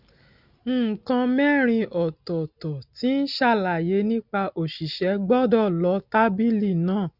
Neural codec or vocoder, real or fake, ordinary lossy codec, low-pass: none; real; none; 5.4 kHz